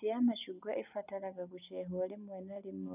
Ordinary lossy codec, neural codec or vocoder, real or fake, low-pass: none; none; real; 3.6 kHz